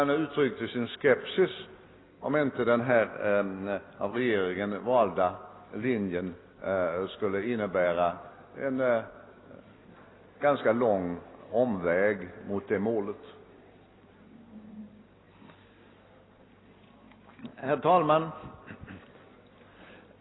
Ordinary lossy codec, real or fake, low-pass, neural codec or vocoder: AAC, 16 kbps; real; 7.2 kHz; none